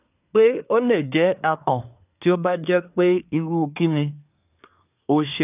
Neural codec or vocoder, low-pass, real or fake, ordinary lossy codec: codec, 24 kHz, 1 kbps, SNAC; 3.6 kHz; fake; none